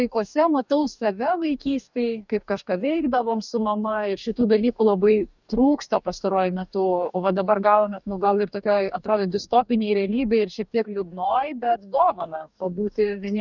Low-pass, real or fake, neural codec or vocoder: 7.2 kHz; fake; codec, 44.1 kHz, 2.6 kbps, DAC